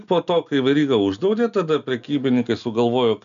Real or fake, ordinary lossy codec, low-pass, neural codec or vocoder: real; MP3, 96 kbps; 7.2 kHz; none